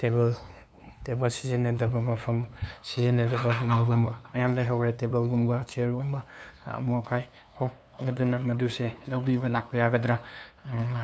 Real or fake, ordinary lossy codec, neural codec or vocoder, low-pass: fake; none; codec, 16 kHz, 2 kbps, FunCodec, trained on LibriTTS, 25 frames a second; none